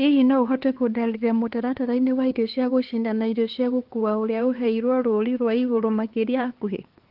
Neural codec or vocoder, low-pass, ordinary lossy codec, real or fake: codec, 16 kHz, 4 kbps, X-Codec, HuBERT features, trained on LibriSpeech; 5.4 kHz; Opus, 16 kbps; fake